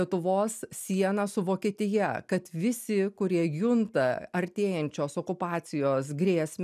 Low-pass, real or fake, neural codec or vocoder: 14.4 kHz; real; none